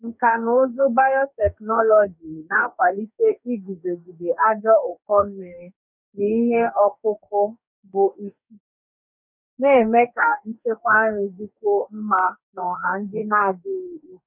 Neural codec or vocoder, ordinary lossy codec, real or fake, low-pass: codec, 44.1 kHz, 2.6 kbps, DAC; none; fake; 3.6 kHz